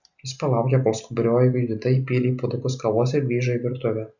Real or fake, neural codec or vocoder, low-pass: real; none; 7.2 kHz